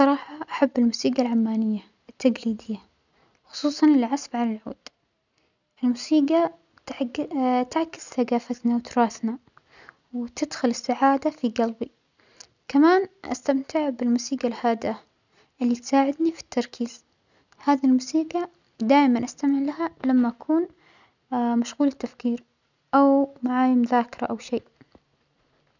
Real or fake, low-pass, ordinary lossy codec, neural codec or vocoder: real; 7.2 kHz; none; none